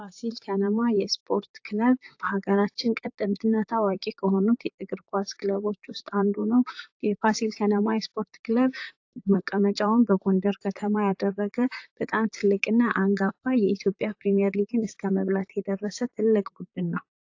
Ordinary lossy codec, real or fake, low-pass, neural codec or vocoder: AAC, 48 kbps; fake; 7.2 kHz; vocoder, 44.1 kHz, 128 mel bands, Pupu-Vocoder